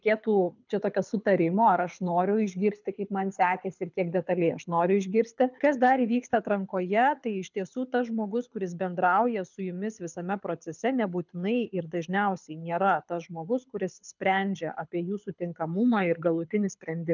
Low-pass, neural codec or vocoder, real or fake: 7.2 kHz; codec, 24 kHz, 6 kbps, HILCodec; fake